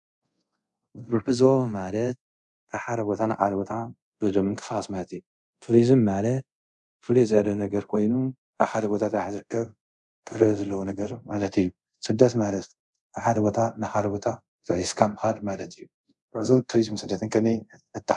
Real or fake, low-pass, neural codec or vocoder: fake; 10.8 kHz; codec, 24 kHz, 0.5 kbps, DualCodec